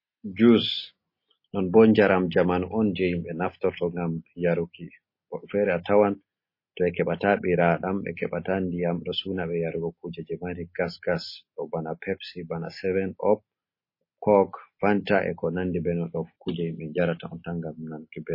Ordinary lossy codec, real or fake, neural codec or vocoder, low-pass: MP3, 24 kbps; real; none; 5.4 kHz